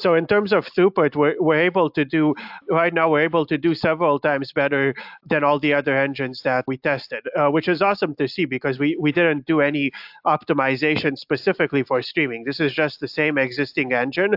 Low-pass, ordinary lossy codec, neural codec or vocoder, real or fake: 5.4 kHz; AAC, 48 kbps; none; real